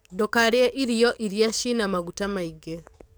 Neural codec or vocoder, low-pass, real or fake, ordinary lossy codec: codec, 44.1 kHz, 7.8 kbps, DAC; none; fake; none